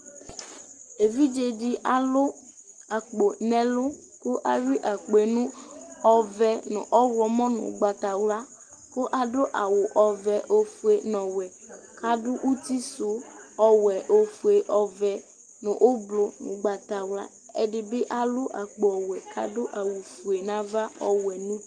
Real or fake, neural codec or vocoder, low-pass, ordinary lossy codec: real; none; 9.9 kHz; Opus, 24 kbps